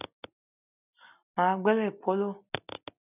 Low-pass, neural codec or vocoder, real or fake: 3.6 kHz; none; real